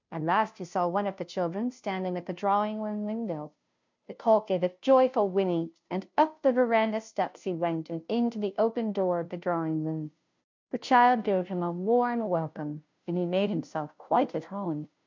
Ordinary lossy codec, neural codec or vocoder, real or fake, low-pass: MP3, 64 kbps; codec, 16 kHz, 0.5 kbps, FunCodec, trained on Chinese and English, 25 frames a second; fake; 7.2 kHz